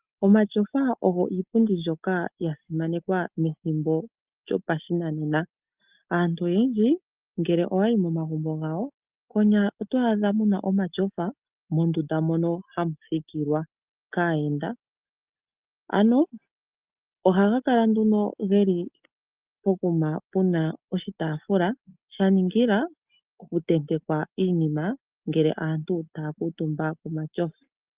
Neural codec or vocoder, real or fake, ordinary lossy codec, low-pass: none; real; Opus, 32 kbps; 3.6 kHz